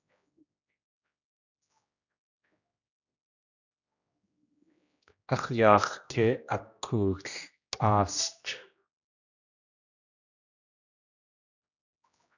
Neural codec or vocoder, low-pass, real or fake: codec, 16 kHz, 1 kbps, X-Codec, HuBERT features, trained on general audio; 7.2 kHz; fake